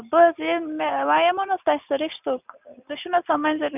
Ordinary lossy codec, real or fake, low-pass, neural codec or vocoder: none; real; 3.6 kHz; none